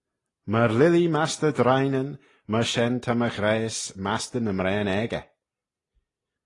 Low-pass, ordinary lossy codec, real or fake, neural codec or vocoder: 10.8 kHz; AAC, 32 kbps; real; none